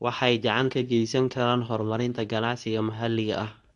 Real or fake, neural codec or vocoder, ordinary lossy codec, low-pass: fake; codec, 24 kHz, 0.9 kbps, WavTokenizer, medium speech release version 2; none; 10.8 kHz